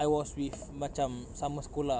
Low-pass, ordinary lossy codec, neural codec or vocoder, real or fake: none; none; none; real